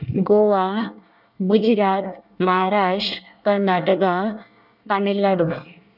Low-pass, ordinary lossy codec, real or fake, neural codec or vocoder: 5.4 kHz; none; fake; codec, 24 kHz, 1 kbps, SNAC